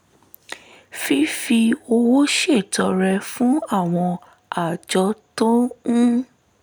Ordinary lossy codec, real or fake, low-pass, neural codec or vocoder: none; real; none; none